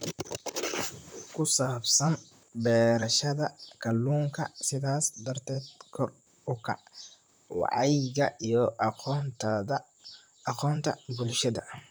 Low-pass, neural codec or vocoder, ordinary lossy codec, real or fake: none; vocoder, 44.1 kHz, 128 mel bands, Pupu-Vocoder; none; fake